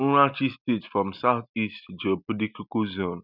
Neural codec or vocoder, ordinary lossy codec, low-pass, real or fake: none; none; 5.4 kHz; real